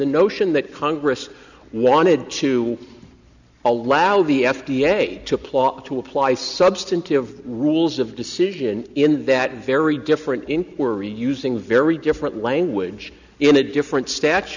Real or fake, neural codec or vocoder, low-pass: real; none; 7.2 kHz